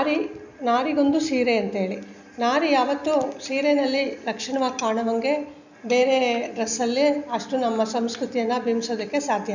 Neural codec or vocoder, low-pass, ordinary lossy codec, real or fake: none; 7.2 kHz; none; real